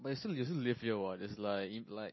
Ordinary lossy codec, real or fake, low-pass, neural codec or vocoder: MP3, 24 kbps; real; 7.2 kHz; none